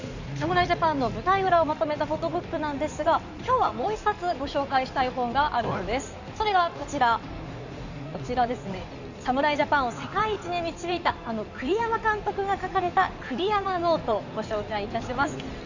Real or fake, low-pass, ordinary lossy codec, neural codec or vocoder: fake; 7.2 kHz; none; codec, 16 kHz in and 24 kHz out, 2.2 kbps, FireRedTTS-2 codec